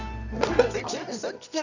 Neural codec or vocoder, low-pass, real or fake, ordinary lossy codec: codec, 24 kHz, 0.9 kbps, WavTokenizer, medium music audio release; 7.2 kHz; fake; none